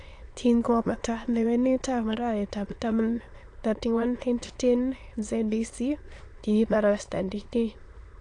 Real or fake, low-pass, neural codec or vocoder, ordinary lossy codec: fake; 9.9 kHz; autoencoder, 22.05 kHz, a latent of 192 numbers a frame, VITS, trained on many speakers; AAC, 48 kbps